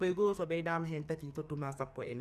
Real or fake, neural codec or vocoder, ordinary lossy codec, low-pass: fake; codec, 44.1 kHz, 2.6 kbps, SNAC; Opus, 64 kbps; 14.4 kHz